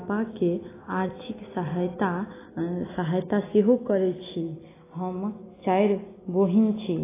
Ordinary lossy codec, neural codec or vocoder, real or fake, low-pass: AAC, 16 kbps; none; real; 3.6 kHz